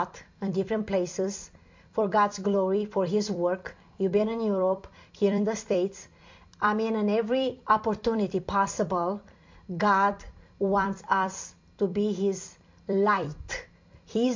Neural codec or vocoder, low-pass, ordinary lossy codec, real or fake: vocoder, 44.1 kHz, 128 mel bands every 256 samples, BigVGAN v2; 7.2 kHz; MP3, 48 kbps; fake